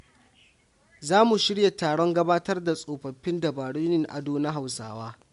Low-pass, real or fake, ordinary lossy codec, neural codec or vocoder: 10.8 kHz; real; MP3, 64 kbps; none